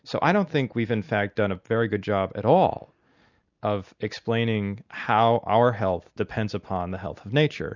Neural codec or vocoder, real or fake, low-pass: none; real; 7.2 kHz